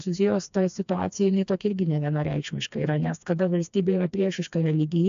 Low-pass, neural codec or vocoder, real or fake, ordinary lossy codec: 7.2 kHz; codec, 16 kHz, 2 kbps, FreqCodec, smaller model; fake; AAC, 64 kbps